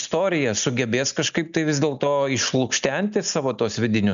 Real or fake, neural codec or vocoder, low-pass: real; none; 7.2 kHz